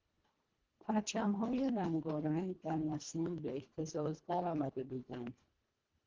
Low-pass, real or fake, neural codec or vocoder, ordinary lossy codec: 7.2 kHz; fake; codec, 24 kHz, 1.5 kbps, HILCodec; Opus, 16 kbps